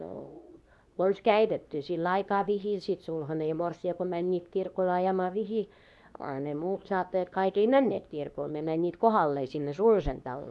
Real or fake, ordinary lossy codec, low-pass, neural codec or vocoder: fake; none; none; codec, 24 kHz, 0.9 kbps, WavTokenizer, medium speech release version 2